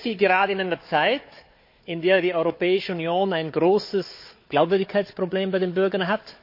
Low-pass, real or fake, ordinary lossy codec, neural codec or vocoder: 5.4 kHz; fake; MP3, 32 kbps; codec, 16 kHz, 4 kbps, FunCodec, trained on Chinese and English, 50 frames a second